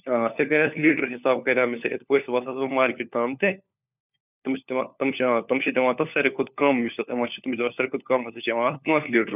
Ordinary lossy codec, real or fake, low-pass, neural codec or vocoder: none; fake; 3.6 kHz; codec, 16 kHz, 4 kbps, FunCodec, trained on LibriTTS, 50 frames a second